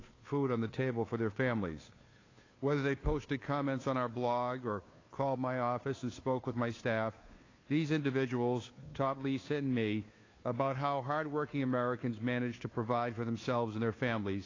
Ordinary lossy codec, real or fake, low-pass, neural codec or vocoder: AAC, 32 kbps; fake; 7.2 kHz; codec, 16 kHz, 2 kbps, FunCodec, trained on Chinese and English, 25 frames a second